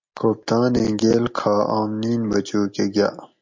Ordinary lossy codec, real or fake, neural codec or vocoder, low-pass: MP3, 48 kbps; real; none; 7.2 kHz